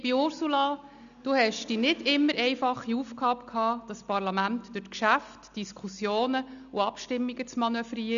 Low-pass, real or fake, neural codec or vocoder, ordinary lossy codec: 7.2 kHz; real; none; none